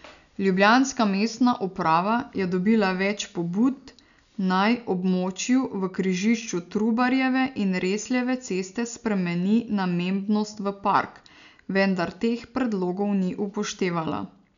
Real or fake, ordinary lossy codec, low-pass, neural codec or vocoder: real; none; 7.2 kHz; none